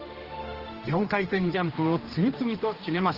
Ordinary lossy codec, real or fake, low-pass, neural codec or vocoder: Opus, 16 kbps; fake; 5.4 kHz; codec, 16 kHz, 1 kbps, X-Codec, HuBERT features, trained on general audio